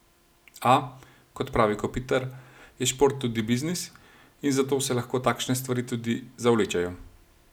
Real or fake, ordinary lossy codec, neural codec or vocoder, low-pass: real; none; none; none